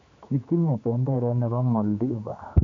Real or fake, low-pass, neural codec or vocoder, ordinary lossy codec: fake; 7.2 kHz; codec, 16 kHz, 4 kbps, X-Codec, HuBERT features, trained on general audio; MP3, 48 kbps